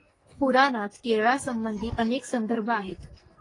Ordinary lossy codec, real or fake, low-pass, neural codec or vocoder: AAC, 32 kbps; fake; 10.8 kHz; codec, 44.1 kHz, 2.6 kbps, SNAC